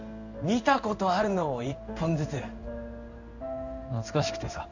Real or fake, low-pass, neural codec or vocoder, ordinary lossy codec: fake; 7.2 kHz; codec, 16 kHz in and 24 kHz out, 1 kbps, XY-Tokenizer; none